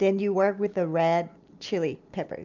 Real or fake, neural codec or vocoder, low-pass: real; none; 7.2 kHz